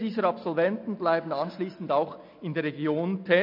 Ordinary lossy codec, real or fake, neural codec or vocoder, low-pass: MP3, 48 kbps; real; none; 5.4 kHz